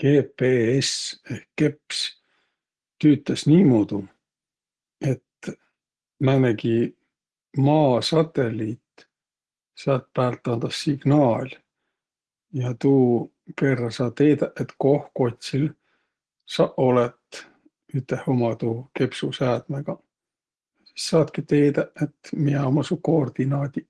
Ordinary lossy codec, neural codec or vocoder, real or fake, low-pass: Opus, 16 kbps; none; real; 10.8 kHz